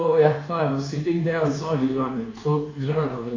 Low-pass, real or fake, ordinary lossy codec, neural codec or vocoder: 7.2 kHz; fake; AAC, 32 kbps; codec, 24 kHz, 1.2 kbps, DualCodec